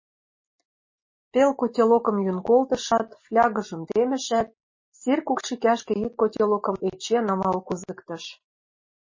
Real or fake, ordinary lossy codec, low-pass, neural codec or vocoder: real; MP3, 32 kbps; 7.2 kHz; none